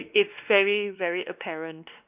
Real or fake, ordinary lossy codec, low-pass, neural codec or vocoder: fake; none; 3.6 kHz; codec, 16 kHz, 2 kbps, X-Codec, WavLM features, trained on Multilingual LibriSpeech